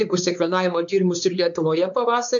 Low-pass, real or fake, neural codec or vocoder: 7.2 kHz; fake; codec, 16 kHz, 4 kbps, X-Codec, WavLM features, trained on Multilingual LibriSpeech